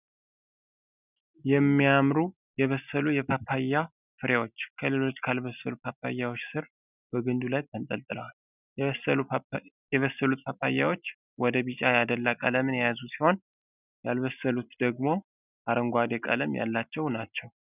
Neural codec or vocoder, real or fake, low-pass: none; real; 3.6 kHz